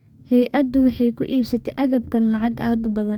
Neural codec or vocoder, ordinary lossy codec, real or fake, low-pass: codec, 44.1 kHz, 2.6 kbps, DAC; MP3, 96 kbps; fake; 19.8 kHz